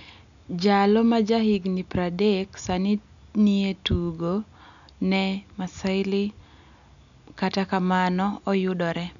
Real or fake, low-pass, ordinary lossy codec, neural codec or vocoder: real; 7.2 kHz; none; none